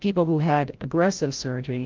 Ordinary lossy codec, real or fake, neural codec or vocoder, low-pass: Opus, 32 kbps; fake; codec, 16 kHz, 0.5 kbps, FreqCodec, larger model; 7.2 kHz